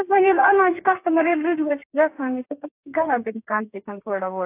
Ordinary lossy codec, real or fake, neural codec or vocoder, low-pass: AAC, 24 kbps; fake; codec, 32 kHz, 1.9 kbps, SNAC; 3.6 kHz